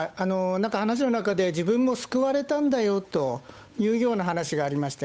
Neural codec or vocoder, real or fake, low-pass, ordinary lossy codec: codec, 16 kHz, 8 kbps, FunCodec, trained on Chinese and English, 25 frames a second; fake; none; none